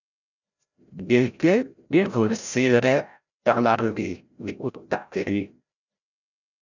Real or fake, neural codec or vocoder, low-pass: fake; codec, 16 kHz, 0.5 kbps, FreqCodec, larger model; 7.2 kHz